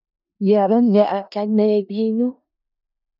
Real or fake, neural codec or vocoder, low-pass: fake; codec, 16 kHz in and 24 kHz out, 0.4 kbps, LongCat-Audio-Codec, four codebook decoder; 5.4 kHz